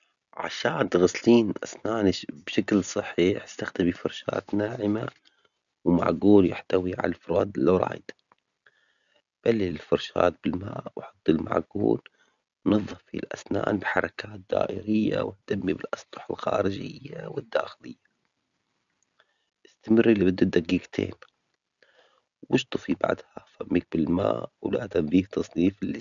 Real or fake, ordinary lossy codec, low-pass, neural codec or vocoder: real; none; 7.2 kHz; none